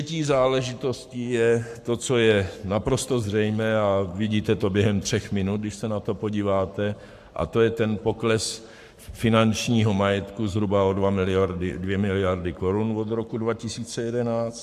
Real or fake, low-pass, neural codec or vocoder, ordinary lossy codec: fake; 14.4 kHz; codec, 44.1 kHz, 7.8 kbps, Pupu-Codec; AAC, 96 kbps